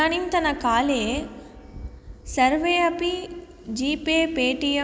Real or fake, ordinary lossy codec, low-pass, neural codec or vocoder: real; none; none; none